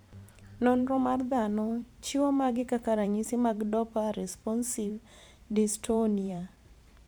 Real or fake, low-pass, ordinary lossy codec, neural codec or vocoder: fake; none; none; vocoder, 44.1 kHz, 128 mel bands every 512 samples, BigVGAN v2